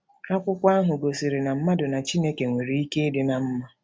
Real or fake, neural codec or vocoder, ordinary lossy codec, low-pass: real; none; none; none